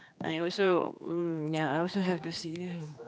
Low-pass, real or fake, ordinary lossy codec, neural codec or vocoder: none; fake; none; codec, 16 kHz, 2 kbps, X-Codec, HuBERT features, trained on general audio